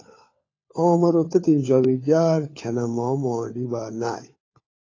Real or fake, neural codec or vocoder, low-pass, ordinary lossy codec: fake; codec, 16 kHz, 4 kbps, FunCodec, trained on LibriTTS, 50 frames a second; 7.2 kHz; AAC, 32 kbps